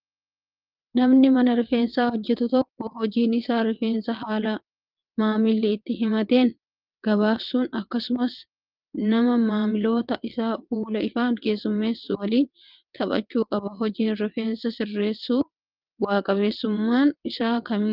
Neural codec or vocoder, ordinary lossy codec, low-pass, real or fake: vocoder, 22.05 kHz, 80 mel bands, WaveNeXt; Opus, 32 kbps; 5.4 kHz; fake